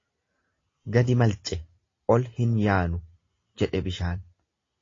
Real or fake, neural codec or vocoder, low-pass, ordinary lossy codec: real; none; 7.2 kHz; AAC, 32 kbps